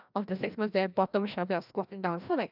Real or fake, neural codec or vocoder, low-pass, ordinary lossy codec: fake; codec, 16 kHz, 1 kbps, FreqCodec, larger model; 5.4 kHz; none